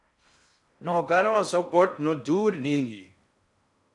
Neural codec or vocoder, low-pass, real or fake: codec, 16 kHz in and 24 kHz out, 0.6 kbps, FocalCodec, streaming, 4096 codes; 10.8 kHz; fake